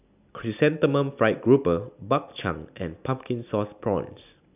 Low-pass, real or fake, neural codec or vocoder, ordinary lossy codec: 3.6 kHz; real; none; none